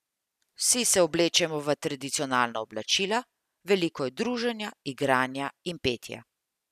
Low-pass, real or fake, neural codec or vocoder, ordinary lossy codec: 14.4 kHz; real; none; none